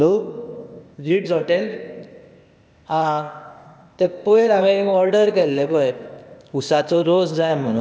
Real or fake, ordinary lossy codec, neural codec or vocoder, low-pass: fake; none; codec, 16 kHz, 0.8 kbps, ZipCodec; none